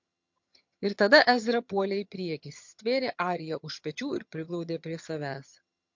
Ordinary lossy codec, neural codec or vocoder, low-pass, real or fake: MP3, 48 kbps; vocoder, 22.05 kHz, 80 mel bands, HiFi-GAN; 7.2 kHz; fake